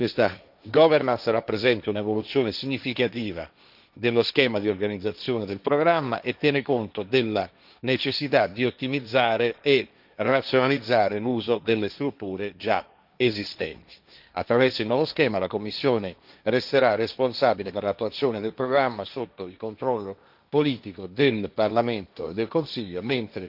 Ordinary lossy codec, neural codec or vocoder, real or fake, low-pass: none; codec, 16 kHz, 1.1 kbps, Voila-Tokenizer; fake; 5.4 kHz